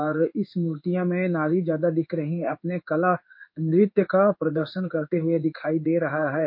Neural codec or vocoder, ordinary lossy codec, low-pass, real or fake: codec, 16 kHz in and 24 kHz out, 1 kbps, XY-Tokenizer; MP3, 32 kbps; 5.4 kHz; fake